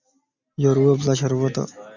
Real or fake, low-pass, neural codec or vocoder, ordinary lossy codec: real; 7.2 kHz; none; Opus, 64 kbps